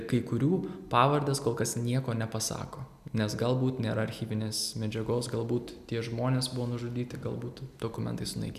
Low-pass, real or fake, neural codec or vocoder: 14.4 kHz; real; none